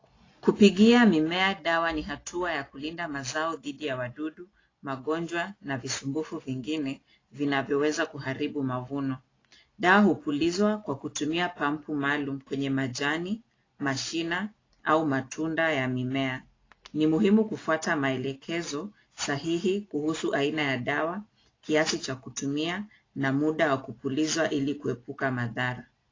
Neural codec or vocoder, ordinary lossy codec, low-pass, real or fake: none; AAC, 32 kbps; 7.2 kHz; real